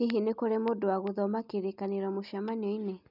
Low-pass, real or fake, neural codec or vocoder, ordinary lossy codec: 5.4 kHz; real; none; none